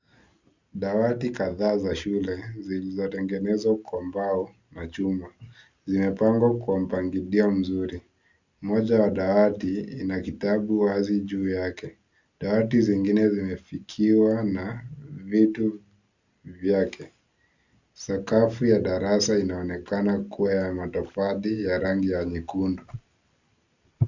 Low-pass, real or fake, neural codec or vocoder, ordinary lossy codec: 7.2 kHz; real; none; Opus, 64 kbps